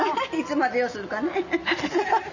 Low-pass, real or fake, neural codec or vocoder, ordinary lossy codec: 7.2 kHz; real; none; none